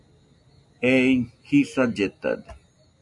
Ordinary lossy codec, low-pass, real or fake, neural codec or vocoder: AAC, 64 kbps; 10.8 kHz; real; none